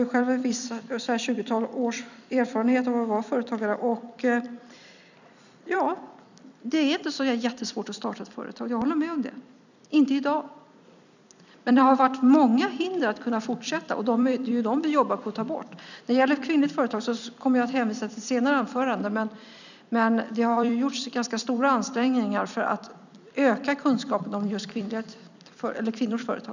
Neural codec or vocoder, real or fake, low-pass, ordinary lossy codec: vocoder, 44.1 kHz, 128 mel bands every 256 samples, BigVGAN v2; fake; 7.2 kHz; none